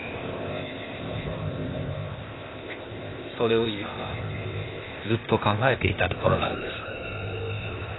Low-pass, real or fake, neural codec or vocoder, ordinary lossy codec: 7.2 kHz; fake; codec, 16 kHz, 0.8 kbps, ZipCodec; AAC, 16 kbps